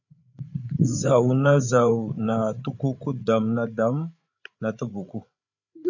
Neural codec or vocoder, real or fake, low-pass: codec, 16 kHz, 8 kbps, FreqCodec, larger model; fake; 7.2 kHz